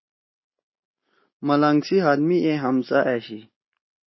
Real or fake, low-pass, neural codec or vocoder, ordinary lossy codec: real; 7.2 kHz; none; MP3, 24 kbps